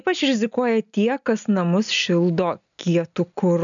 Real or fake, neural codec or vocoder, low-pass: real; none; 7.2 kHz